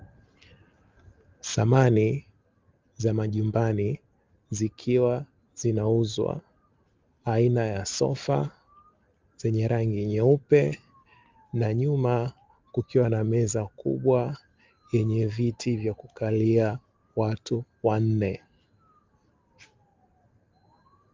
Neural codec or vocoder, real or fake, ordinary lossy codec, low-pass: none; real; Opus, 24 kbps; 7.2 kHz